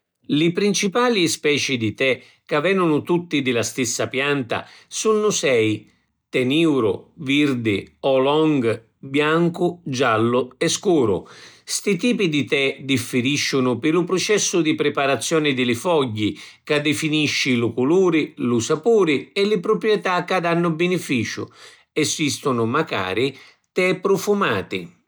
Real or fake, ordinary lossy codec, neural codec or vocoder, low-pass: real; none; none; none